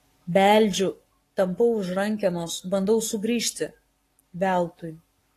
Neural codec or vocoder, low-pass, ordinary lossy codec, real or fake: codec, 44.1 kHz, 7.8 kbps, Pupu-Codec; 14.4 kHz; AAC, 48 kbps; fake